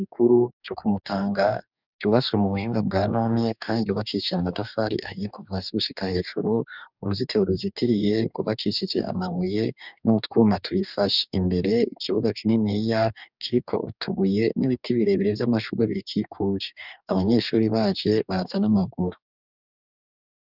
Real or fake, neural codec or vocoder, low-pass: fake; codec, 44.1 kHz, 2.6 kbps, DAC; 5.4 kHz